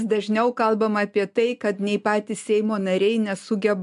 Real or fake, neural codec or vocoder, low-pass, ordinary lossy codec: real; none; 10.8 kHz; MP3, 64 kbps